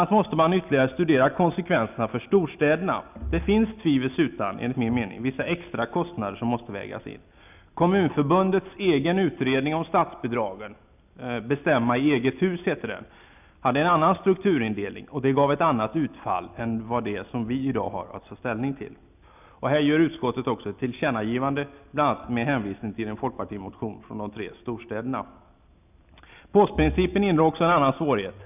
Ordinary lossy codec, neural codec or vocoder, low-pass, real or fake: none; none; 3.6 kHz; real